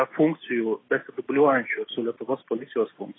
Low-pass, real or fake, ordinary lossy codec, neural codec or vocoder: 7.2 kHz; real; AAC, 16 kbps; none